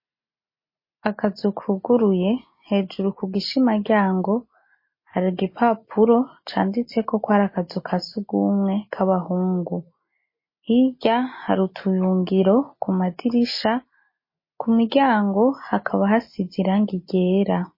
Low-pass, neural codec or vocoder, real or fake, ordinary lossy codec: 5.4 kHz; none; real; MP3, 24 kbps